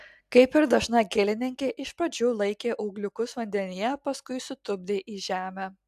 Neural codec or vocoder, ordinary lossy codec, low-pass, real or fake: none; AAC, 96 kbps; 14.4 kHz; real